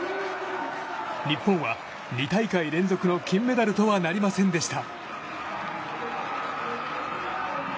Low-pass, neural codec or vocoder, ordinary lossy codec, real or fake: none; none; none; real